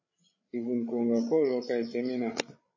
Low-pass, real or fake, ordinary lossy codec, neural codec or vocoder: 7.2 kHz; fake; MP3, 32 kbps; codec, 16 kHz, 16 kbps, FreqCodec, larger model